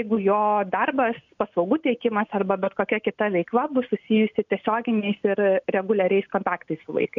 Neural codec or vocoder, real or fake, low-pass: none; real; 7.2 kHz